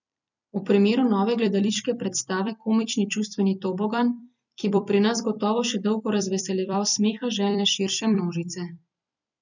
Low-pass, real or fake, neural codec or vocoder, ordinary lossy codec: 7.2 kHz; fake; vocoder, 44.1 kHz, 128 mel bands every 256 samples, BigVGAN v2; none